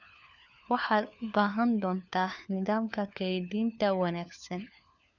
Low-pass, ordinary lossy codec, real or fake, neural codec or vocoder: 7.2 kHz; none; fake; codec, 16 kHz, 4 kbps, FunCodec, trained on LibriTTS, 50 frames a second